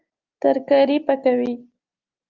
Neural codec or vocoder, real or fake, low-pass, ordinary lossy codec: none; real; 7.2 kHz; Opus, 24 kbps